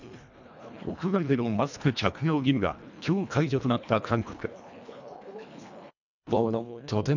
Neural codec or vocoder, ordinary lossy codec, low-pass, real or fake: codec, 24 kHz, 1.5 kbps, HILCodec; none; 7.2 kHz; fake